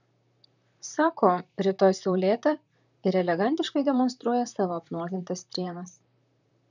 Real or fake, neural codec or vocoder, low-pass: fake; vocoder, 44.1 kHz, 128 mel bands, Pupu-Vocoder; 7.2 kHz